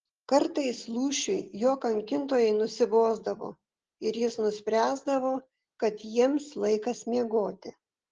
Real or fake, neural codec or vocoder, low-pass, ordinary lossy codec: real; none; 7.2 kHz; Opus, 16 kbps